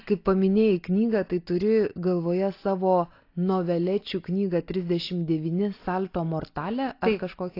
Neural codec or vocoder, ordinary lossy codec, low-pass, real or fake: none; AAC, 32 kbps; 5.4 kHz; real